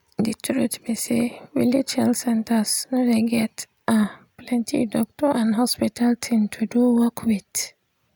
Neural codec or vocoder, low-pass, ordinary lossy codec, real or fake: none; none; none; real